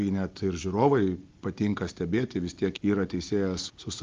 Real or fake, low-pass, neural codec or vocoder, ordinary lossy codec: real; 7.2 kHz; none; Opus, 32 kbps